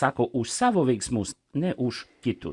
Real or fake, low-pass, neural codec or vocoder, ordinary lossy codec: real; 10.8 kHz; none; Opus, 64 kbps